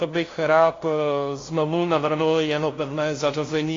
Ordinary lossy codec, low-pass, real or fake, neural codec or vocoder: AAC, 32 kbps; 7.2 kHz; fake; codec, 16 kHz, 0.5 kbps, FunCodec, trained on LibriTTS, 25 frames a second